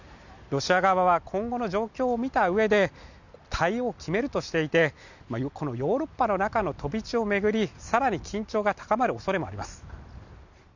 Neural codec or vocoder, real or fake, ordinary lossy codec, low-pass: none; real; none; 7.2 kHz